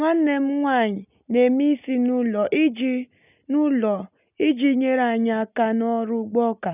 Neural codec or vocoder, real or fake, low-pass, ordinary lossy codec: none; real; 3.6 kHz; none